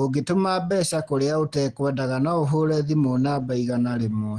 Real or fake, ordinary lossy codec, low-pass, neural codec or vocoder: real; Opus, 16 kbps; 9.9 kHz; none